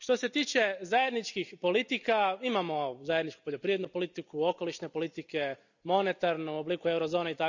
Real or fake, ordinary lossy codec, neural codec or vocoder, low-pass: real; none; none; 7.2 kHz